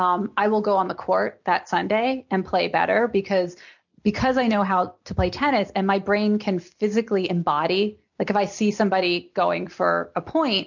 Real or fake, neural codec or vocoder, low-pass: real; none; 7.2 kHz